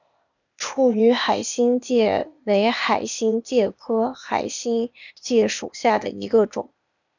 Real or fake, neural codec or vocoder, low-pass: fake; codec, 16 kHz, 0.8 kbps, ZipCodec; 7.2 kHz